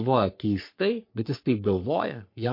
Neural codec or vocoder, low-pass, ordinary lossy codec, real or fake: codec, 44.1 kHz, 3.4 kbps, Pupu-Codec; 5.4 kHz; MP3, 32 kbps; fake